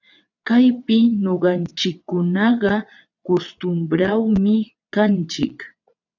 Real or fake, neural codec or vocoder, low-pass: fake; vocoder, 22.05 kHz, 80 mel bands, WaveNeXt; 7.2 kHz